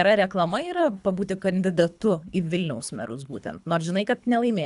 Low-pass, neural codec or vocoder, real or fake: 10.8 kHz; codec, 24 kHz, 3 kbps, HILCodec; fake